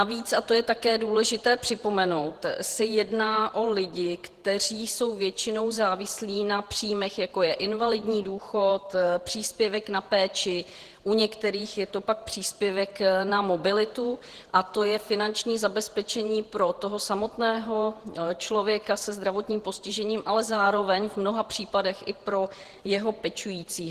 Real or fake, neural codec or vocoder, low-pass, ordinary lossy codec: fake; vocoder, 48 kHz, 128 mel bands, Vocos; 14.4 kHz; Opus, 16 kbps